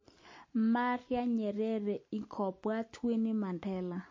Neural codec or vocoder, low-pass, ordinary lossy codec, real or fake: none; 7.2 kHz; MP3, 32 kbps; real